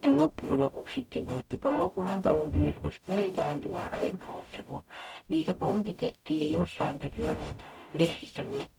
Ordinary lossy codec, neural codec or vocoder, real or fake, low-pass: none; codec, 44.1 kHz, 0.9 kbps, DAC; fake; 19.8 kHz